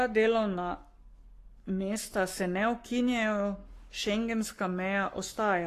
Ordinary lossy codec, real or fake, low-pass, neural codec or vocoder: AAC, 48 kbps; fake; 14.4 kHz; codec, 44.1 kHz, 7.8 kbps, Pupu-Codec